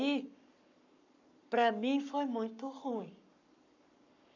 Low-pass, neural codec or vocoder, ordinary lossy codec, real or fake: 7.2 kHz; codec, 44.1 kHz, 7.8 kbps, Pupu-Codec; none; fake